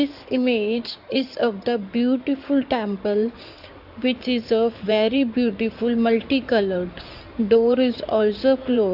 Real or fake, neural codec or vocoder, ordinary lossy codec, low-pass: fake; codec, 16 kHz in and 24 kHz out, 2.2 kbps, FireRedTTS-2 codec; AAC, 48 kbps; 5.4 kHz